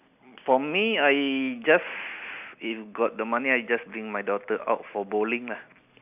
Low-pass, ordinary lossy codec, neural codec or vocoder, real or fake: 3.6 kHz; none; none; real